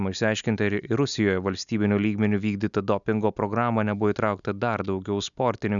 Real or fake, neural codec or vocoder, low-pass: real; none; 7.2 kHz